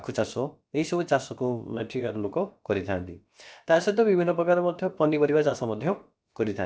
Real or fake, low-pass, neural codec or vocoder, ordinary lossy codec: fake; none; codec, 16 kHz, about 1 kbps, DyCAST, with the encoder's durations; none